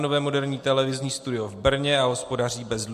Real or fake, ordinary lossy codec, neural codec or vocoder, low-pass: real; MP3, 64 kbps; none; 14.4 kHz